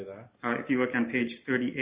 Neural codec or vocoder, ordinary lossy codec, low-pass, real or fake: none; Opus, 64 kbps; 3.6 kHz; real